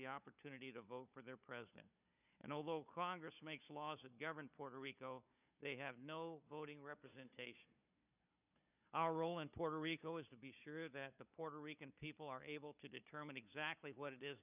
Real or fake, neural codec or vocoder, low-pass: fake; codec, 16 kHz, 4 kbps, FunCodec, trained on Chinese and English, 50 frames a second; 3.6 kHz